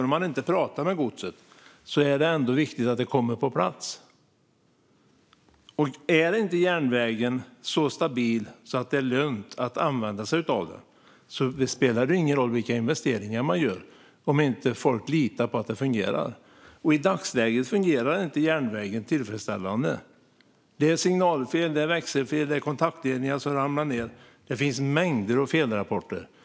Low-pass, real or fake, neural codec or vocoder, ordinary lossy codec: none; real; none; none